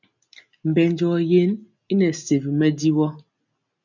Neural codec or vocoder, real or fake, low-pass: none; real; 7.2 kHz